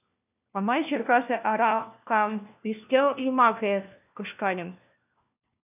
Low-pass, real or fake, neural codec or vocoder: 3.6 kHz; fake; codec, 16 kHz, 1 kbps, FunCodec, trained on LibriTTS, 50 frames a second